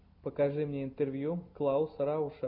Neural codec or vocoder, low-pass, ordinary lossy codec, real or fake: none; 5.4 kHz; AAC, 48 kbps; real